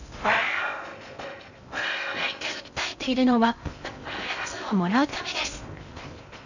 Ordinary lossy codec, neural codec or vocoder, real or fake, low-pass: none; codec, 16 kHz in and 24 kHz out, 0.6 kbps, FocalCodec, streaming, 2048 codes; fake; 7.2 kHz